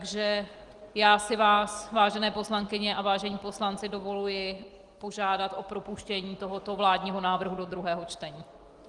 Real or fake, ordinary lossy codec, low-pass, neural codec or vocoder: real; Opus, 24 kbps; 10.8 kHz; none